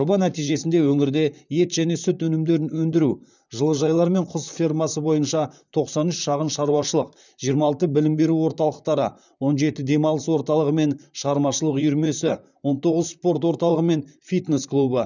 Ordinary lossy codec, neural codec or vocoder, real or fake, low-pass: none; vocoder, 44.1 kHz, 128 mel bands, Pupu-Vocoder; fake; 7.2 kHz